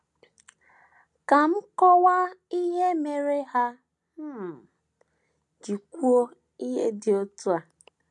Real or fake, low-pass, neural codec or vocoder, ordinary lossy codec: real; 10.8 kHz; none; none